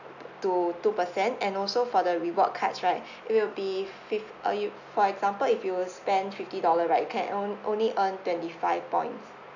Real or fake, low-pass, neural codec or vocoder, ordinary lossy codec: real; 7.2 kHz; none; none